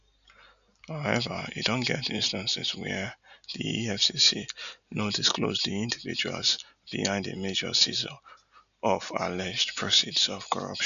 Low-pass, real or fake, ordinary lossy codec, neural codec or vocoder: 7.2 kHz; real; none; none